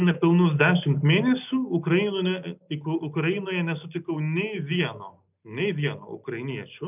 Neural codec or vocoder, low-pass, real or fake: none; 3.6 kHz; real